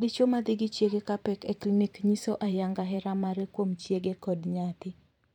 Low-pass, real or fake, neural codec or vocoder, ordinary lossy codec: 19.8 kHz; fake; vocoder, 44.1 kHz, 128 mel bands every 512 samples, BigVGAN v2; none